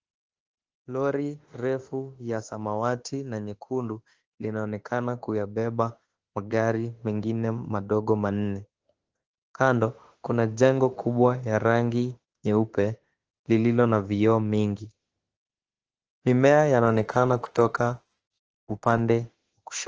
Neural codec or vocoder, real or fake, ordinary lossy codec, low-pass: autoencoder, 48 kHz, 32 numbers a frame, DAC-VAE, trained on Japanese speech; fake; Opus, 16 kbps; 7.2 kHz